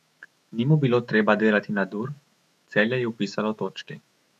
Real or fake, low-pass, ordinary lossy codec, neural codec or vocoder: fake; 14.4 kHz; none; autoencoder, 48 kHz, 128 numbers a frame, DAC-VAE, trained on Japanese speech